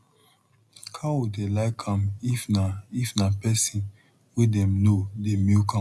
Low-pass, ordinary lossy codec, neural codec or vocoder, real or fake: none; none; none; real